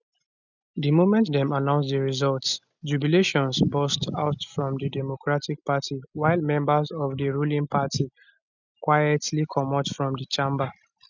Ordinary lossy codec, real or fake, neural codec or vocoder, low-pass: none; real; none; 7.2 kHz